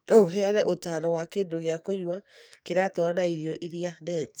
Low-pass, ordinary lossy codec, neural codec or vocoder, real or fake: none; none; codec, 44.1 kHz, 2.6 kbps, SNAC; fake